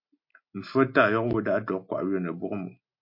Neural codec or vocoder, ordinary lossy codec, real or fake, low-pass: none; MP3, 32 kbps; real; 5.4 kHz